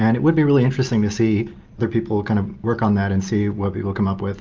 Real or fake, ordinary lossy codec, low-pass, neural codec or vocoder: real; Opus, 24 kbps; 7.2 kHz; none